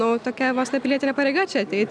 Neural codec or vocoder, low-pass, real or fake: none; 9.9 kHz; real